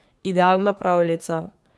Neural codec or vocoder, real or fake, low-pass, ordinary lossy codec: codec, 24 kHz, 1 kbps, SNAC; fake; none; none